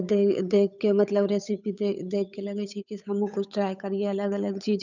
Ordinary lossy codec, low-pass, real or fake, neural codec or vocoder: none; 7.2 kHz; fake; codec, 16 kHz, 8 kbps, FunCodec, trained on Chinese and English, 25 frames a second